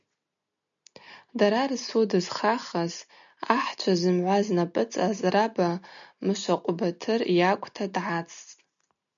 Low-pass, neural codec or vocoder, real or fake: 7.2 kHz; none; real